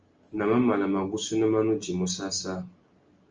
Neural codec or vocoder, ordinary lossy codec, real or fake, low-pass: none; Opus, 24 kbps; real; 7.2 kHz